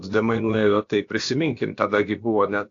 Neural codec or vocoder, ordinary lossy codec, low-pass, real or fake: codec, 16 kHz, about 1 kbps, DyCAST, with the encoder's durations; AAC, 48 kbps; 7.2 kHz; fake